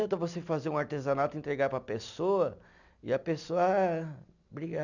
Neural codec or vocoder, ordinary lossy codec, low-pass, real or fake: none; none; 7.2 kHz; real